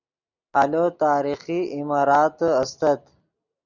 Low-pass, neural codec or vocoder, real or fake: 7.2 kHz; none; real